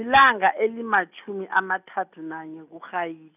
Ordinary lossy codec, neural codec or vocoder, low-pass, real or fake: none; none; 3.6 kHz; real